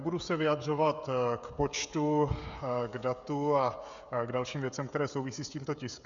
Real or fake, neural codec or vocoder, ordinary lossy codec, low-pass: fake; codec, 16 kHz, 16 kbps, FreqCodec, smaller model; Opus, 64 kbps; 7.2 kHz